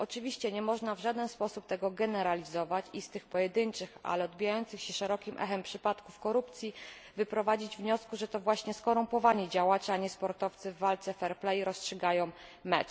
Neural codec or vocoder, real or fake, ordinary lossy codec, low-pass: none; real; none; none